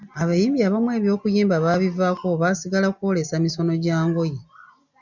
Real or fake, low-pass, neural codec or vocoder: real; 7.2 kHz; none